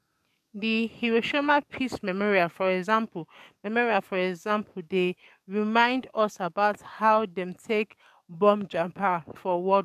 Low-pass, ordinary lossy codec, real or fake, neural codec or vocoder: 14.4 kHz; none; fake; codec, 44.1 kHz, 7.8 kbps, DAC